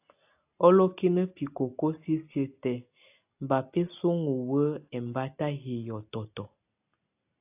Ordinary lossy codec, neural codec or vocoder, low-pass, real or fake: AAC, 32 kbps; none; 3.6 kHz; real